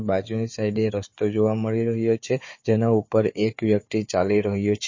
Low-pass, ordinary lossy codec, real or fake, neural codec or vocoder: 7.2 kHz; MP3, 32 kbps; fake; codec, 16 kHz, 4 kbps, FunCodec, trained on Chinese and English, 50 frames a second